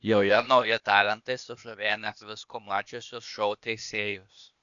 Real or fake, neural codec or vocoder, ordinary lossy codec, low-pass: fake; codec, 16 kHz, 0.8 kbps, ZipCodec; MP3, 96 kbps; 7.2 kHz